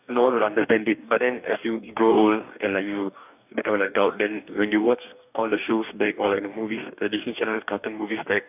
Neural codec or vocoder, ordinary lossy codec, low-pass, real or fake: codec, 44.1 kHz, 2.6 kbps, DAC; none; 3.6 kHz; fake